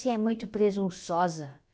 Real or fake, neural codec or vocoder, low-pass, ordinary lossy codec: fake; codec, 16 kHz, about 1 kbps, DyCAST, with the encoder's durations; none; none